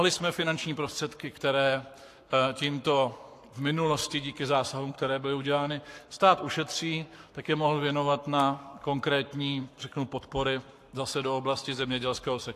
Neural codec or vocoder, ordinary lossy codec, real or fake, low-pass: codec, 44.1 kHz, 7.8 kbps, Pupu-Codec; AAC, 64 kbps; fake; 14.4 kHz